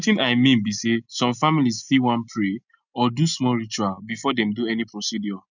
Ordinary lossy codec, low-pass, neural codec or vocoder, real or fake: none; 7.2 kHz; none; real